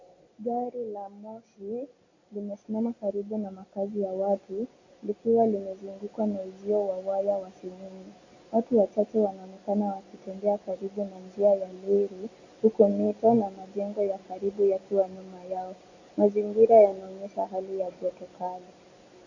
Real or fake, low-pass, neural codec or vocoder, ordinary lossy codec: real; 7.2 kHz; none; AAC, 48 kbps